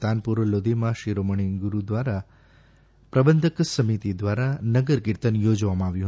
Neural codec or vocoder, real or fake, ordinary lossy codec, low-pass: none; real; none; none